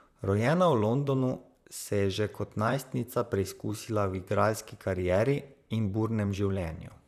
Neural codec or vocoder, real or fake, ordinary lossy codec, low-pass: vocoder, 44.1 kHz, 128 mel bands, Pupu-Vocoder; fake; none; 14.4 kHz